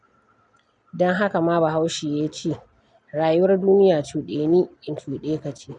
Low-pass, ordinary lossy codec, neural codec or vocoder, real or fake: 10.8 kHz; none; none; real